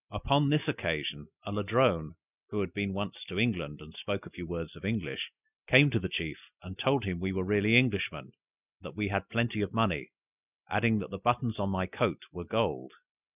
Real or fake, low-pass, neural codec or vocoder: real; 3.6 kHz; none